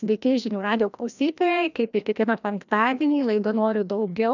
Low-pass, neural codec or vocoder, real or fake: 7.2 kHz; codec, 16 kHz, 1 kbps, FreqCodec, larger model; fake